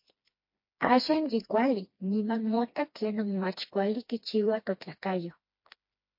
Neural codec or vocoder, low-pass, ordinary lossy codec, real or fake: codec, 16 kHz, 2 kbps, FreqCodec, smaller model; 5.4 kHz; MP3, 32 kbps; fake